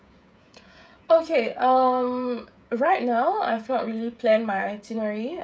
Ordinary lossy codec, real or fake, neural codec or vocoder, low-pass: none; fake; codec, 16 kHz, 16 kbps, FreqCodec, smaller model; none